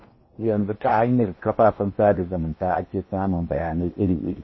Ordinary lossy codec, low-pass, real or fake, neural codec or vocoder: MP3, 24 kbps; 7.2 kHz; fake; codec, 16 kHz in and 24 kHz out, 0.8 kbps, FocalCodec, streaming, 65536 codes